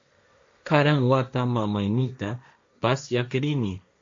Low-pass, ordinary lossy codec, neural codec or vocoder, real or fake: 7.2 kHz; MP3, 48 kbps; codec, 16 kHz, 1.1 kbps, Voila-Tokenizer; fake